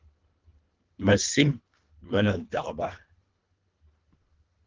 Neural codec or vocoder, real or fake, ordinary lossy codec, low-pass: codec, 24 kHz, 1.5 kbps, HILCodec; fake; Opus, 32 kbps; 7.2 kHz